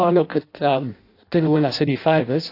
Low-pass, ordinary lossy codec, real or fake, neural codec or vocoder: 5.4 kHz; AAC, 32 kbps; fake; codec, 16 kHz in and 24 kHz out, 0.6 kbps, FireRedTTS-2 codec